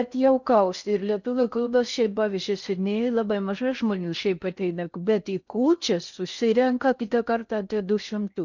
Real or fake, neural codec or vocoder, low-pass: fake; codec, 16 kHz in and 24 kHz out, 0.6 kbps, FocalCodec, streaming, 4096 codes; 7.2 kHz